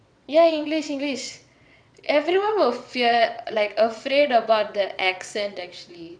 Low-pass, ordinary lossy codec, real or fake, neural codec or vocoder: 9.9 kHz; none; fake; vocoder, 22.05 kHz, 80 mel bands, WaveNeXt